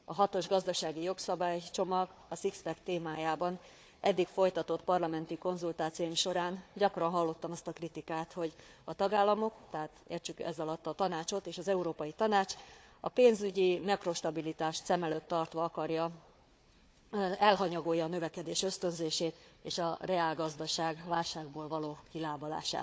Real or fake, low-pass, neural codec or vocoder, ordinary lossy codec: fake; none; codec, 16 kHz, 4 kbps, FunCodec, trained on Chinese and English, 50 frames a second; none